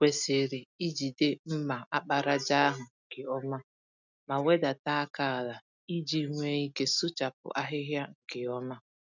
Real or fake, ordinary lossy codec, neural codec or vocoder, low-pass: real; none; none; 7.2 kHz